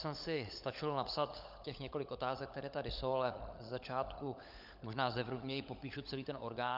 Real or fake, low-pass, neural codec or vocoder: fake; 5.4 kHz; codec, 16 kHz, 16 kbps, FunCodec, trained on LibriTTS, 50 frames a second